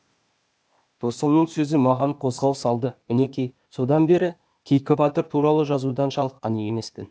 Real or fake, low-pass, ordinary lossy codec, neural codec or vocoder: fake; none; none; codec, 16 kHz, 0.8 kbps, ZipCodec